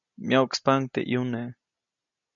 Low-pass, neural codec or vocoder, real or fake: 7.2 kHz; none; real